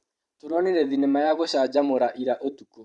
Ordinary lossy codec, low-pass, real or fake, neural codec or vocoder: Opus, 64 kbps; 10.8 kHz; fake; vocoder, 44.1 kHz, 128 mel bands every 512 samples, BigVGAN v2